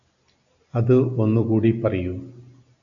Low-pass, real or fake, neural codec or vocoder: 7.2 kHz; real; none